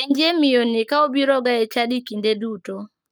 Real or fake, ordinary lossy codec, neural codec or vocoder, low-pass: fake; none; codec, 44.1 kHz, 7.8 kbps, Pupu-Codec; none